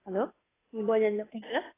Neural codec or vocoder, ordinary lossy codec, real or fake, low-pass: codec, 16 kHz, 2 kbps, FunCodec, trained on Chinese and English, 25 frames a second; AAC, 16 kbps; fake; 3.6 kHz